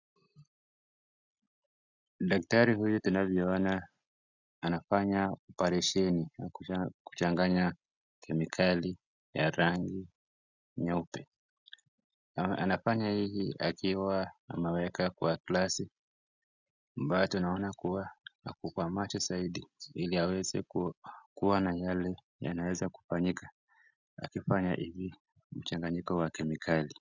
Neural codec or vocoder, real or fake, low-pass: none; real; 7.2 kHz